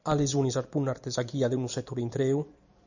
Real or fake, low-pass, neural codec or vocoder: fake; 7.2 kHz; vocoder, 44.1 kHz, 128 mel bands every 256 samples, BigVGAN v2